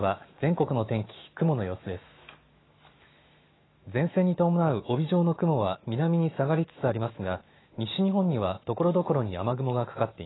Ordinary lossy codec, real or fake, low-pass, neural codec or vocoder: AAC, 16 kbps; fake; 7.2 kHz; autoencoder, 48 kHz, 128 numbers a frame, DAC-VAE, trained on Japanese speech